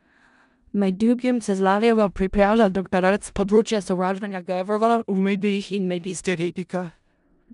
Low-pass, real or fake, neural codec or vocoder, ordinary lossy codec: 10.8 kHz; fake; codec, 16 kHz in and 24 kHz out, 0.4 kbps, LongCat-Audio-Codec, four codebook decoder; MP3, 96 kbps